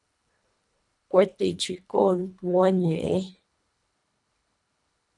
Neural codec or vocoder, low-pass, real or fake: codec, 24 kHz, 1.5 kbps, HILCodec; 10.8 kHz; fake